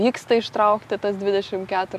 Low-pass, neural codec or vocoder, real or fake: 14.4 kHz; none; real